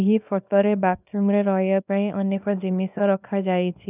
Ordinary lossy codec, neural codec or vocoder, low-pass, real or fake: none; codec, 24 kHz, 0.9 kbps, WavTokenizer, small release; 3.6 kHz; fake